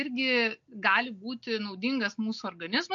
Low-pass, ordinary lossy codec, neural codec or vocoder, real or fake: 7.2 kHz; MP3, 48 kbps; none; real